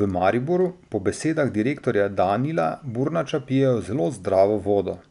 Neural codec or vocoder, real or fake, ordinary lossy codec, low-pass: none; real; none; 10.8 kHz